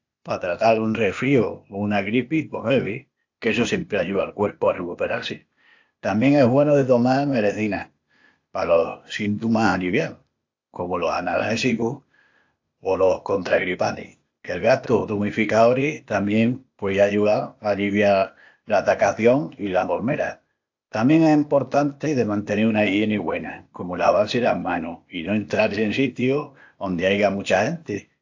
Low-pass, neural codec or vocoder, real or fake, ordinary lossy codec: 7.2 kHz; codec, 16 kHz, 0.8 kbps, ZipCodec; fake; AAC, 48 kbps